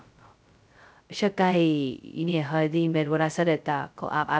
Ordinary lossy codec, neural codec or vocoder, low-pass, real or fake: none; codec, 16 kHz, 0.2 kbps, FocalCodec; none; fake